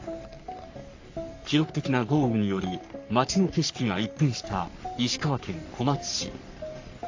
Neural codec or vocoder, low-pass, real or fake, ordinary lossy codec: codec, 44.1 kHz, 3.4 kbps, Pupu-Codec; 7.2 kHz; fake; AAC, 48 kbps